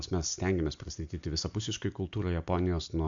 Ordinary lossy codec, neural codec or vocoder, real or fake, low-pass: MP3, 64 kbps; none; real; 7.2 kHz